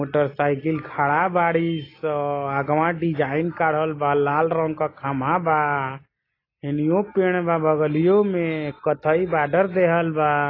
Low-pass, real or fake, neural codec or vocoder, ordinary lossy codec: 5.4 kHz; real; none; AAC, 24 kbps